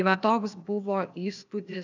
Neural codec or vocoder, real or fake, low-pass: codec, 16 kHz, 0.8 kbps, ZipCodec; fake; 7.2 kHz